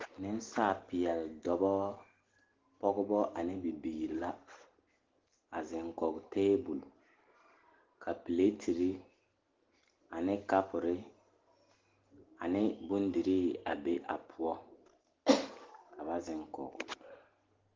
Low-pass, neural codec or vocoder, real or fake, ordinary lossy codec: 7.2 kHz; none; real; Opus, 16 kbps